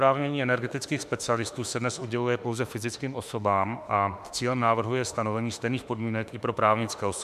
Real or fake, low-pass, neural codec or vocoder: fake; 14.4 kHz; autoencoder, 48 kHz, 32 numbers a frame, DAC-VAE, trained on Japanese speech